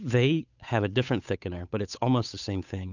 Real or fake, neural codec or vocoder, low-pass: fake; codec, 16 kHz, 8 kbps, FunCodec, trained on Chinese and English, 25 frames a second; 7.2 kHz